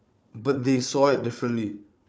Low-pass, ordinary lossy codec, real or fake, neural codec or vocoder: none; none; fake; codec, 16 kHz, 4 kbps, FunCodec, trained on Chinese and English, 50 frames a second